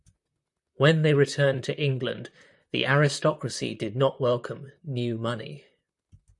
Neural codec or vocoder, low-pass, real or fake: vocoder, 44.1 kHz, 128 mel bands, Pupu-Vocoder; 10.8 kHz; fake